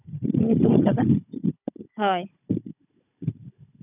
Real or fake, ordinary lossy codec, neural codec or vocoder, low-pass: fake; none; codec, 16 kHz, 16 kbps, FunCodec, trained on Chinese and English, 50 frames a second; 3.6 kHz